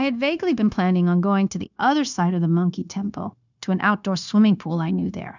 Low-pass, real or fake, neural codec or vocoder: 7.2 kHz; fake; codec, 16 kHz, 0.9 kbps, LongCat-Audio-Codec